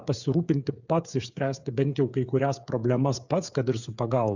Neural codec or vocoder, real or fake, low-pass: codec, 16 kHz, 8 kbps, FreqCodec, smaller model; fake; 7.2 kHz